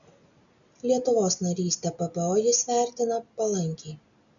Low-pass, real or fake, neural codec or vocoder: 7.2 kHz; real; none